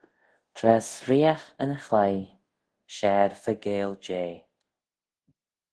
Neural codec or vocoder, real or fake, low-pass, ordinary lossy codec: codec, 24 kHz, 0.5 kbps, DualCodec; fake; 10.8 kHz; Opus, 16 kbps